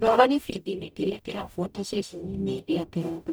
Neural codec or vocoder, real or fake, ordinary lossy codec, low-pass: codec, 44.1 kHz, 0.9 kbps, DAC; fake; none; none